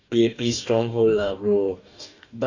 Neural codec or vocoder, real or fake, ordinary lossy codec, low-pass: codec, 44.1 kHz, 2.6 kbps, DAC; fake; none; 7.2 kHz